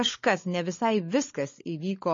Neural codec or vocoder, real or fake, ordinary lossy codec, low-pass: codec, 16 kHz, 16 kbps, FunCodec, trained on LibriTTS, 50 frames a second; fake; MP3, 32 kbps; 7.2 kHz